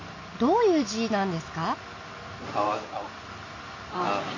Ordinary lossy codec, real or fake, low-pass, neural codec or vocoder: MP3, 32 kbps; real; 7.2 kHz; none